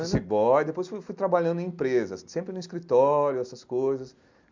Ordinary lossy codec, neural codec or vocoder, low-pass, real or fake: MP3, 64 kbps; none; 7.2 kHz; real